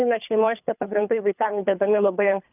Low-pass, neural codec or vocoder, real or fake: 3.6 kHz; codec, 24 kHz, 3 kbps, HILCodec; fake